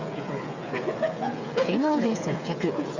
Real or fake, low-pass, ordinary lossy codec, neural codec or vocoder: fake; 7.2 kHz; Opus, 64 kbps; codec, 16 kHz, 8 kbps, FreqCodec, smaller model